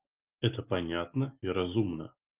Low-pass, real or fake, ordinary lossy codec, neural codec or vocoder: 3.6 kHz; real; Opus, 24 kbps; none